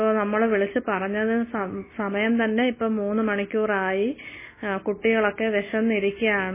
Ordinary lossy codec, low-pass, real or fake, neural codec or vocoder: MP3, 16 kbps; 3.6 kHz; real; none